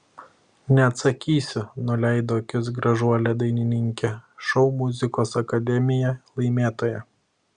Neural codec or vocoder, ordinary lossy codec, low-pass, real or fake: none; Opus, 64 kbps; 9.9 kHz; real